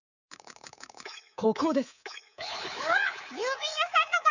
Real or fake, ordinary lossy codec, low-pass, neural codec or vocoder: fake; none; 7.2 kHz; codec, 24 kHz, 3.1 kbps, DualCodec